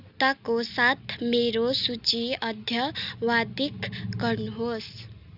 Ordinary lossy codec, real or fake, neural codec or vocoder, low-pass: none; real; none; 5.4 kHz